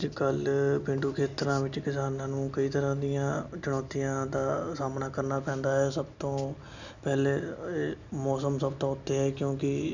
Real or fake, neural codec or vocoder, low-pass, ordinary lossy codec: real; none; 7.2 kHz; none